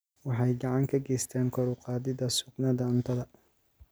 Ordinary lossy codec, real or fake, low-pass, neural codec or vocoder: none; real; none; none